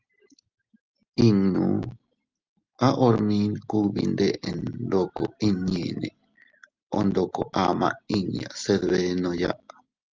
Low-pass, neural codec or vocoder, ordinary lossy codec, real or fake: 7.2 kHz; none; Opus, 32 kbps; real